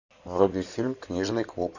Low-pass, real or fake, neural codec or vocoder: 7.2 kHz; fake; codec, 16 kHz in and 24 kHz out, 2.2 kbps, FireRedTTS-2 codec